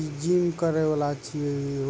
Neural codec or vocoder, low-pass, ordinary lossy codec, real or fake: none; none; none; real